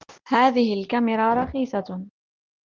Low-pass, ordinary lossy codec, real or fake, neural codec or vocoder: 7.2 kHz; Opus, 32 kbps; real; none